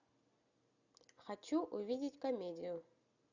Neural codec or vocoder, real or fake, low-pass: none; real; 7.2 kHz